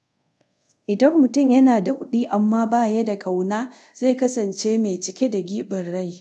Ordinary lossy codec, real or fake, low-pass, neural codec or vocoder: none; fake; none; codec, 24 kHz, 0.5 kbps, DualCodec